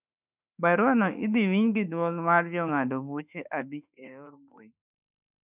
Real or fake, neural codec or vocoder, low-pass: fake; autoencoder, 48 kHz, 32 numbers a frame, DAC-VAE, trained on Japanese speech; 3.6 kHz